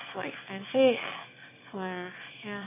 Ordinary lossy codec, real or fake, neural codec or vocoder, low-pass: MP3, 24 kbps; fake; autoencoder, 22.05 kHz, a latent of 192 numbers a frame, VITS, trained on one speaker; 3.6 kHz